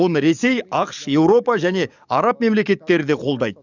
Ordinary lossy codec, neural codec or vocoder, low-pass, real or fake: none; codec, 44.1 kHz, 7.8 kbps, DAC; 7.2 kHz; fake